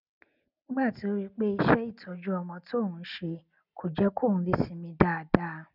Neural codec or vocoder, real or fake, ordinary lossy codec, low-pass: none; real; none; 5.4 kHz